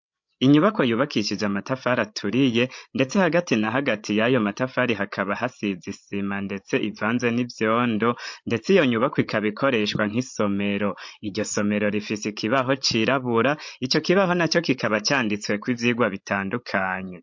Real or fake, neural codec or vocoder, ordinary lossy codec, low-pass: fake; codec, 16 kHz, 16 kbps, FreqCodec, larger model; MP3, 48 kbps; 7.2 kHz